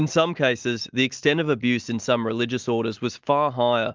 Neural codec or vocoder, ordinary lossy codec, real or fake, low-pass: none; Opus, 24 kbps; real; 7.2 kHz